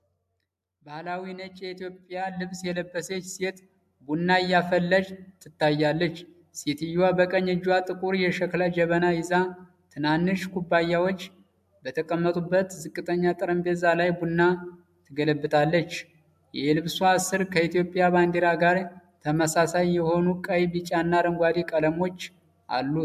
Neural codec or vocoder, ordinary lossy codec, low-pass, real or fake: none; AAC, 96 kbps; 14.4 kHz; real